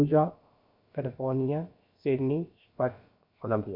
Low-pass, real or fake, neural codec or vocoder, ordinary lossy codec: 5.4 kHz; fake; codec, 16 kHz, about 1 kbps, DyCAST, with the encoder's durations; MP3, 48 kbps